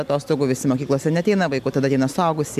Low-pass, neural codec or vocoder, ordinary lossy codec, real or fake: 14.4 kHz; none; MP3, 96 kbps; real